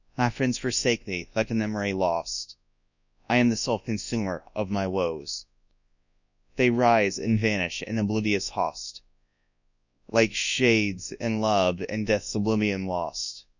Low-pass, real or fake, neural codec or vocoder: 7.2 kHz; fake; codec, 24 kHz, 0.9 kbps, WavTokenizer, large speech release